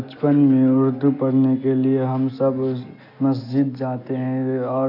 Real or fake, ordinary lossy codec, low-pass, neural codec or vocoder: real; AAC, 32 kbps; 5.4 kHz; none